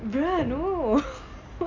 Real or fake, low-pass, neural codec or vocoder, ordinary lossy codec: real; 7.2 kHz; none; MP3, 64 kbps